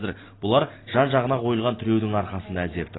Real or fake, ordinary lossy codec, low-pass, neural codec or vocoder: real; AAC, 16 kbps; 7.2 kHz; none